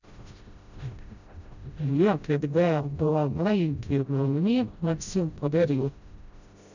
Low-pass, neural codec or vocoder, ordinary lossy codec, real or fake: 7.2 kHz; codec, 16 kHz, 0.5 kbps, FreqCodec, smaller model; none; fake